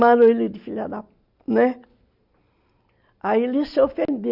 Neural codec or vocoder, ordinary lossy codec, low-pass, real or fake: none; Opus, 64 kbps; 5.4 kHz; real